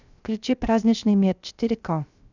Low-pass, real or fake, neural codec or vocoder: 7.2 kHz; fake; codec, 16 kHz, 0.3 kbps, FocalCodec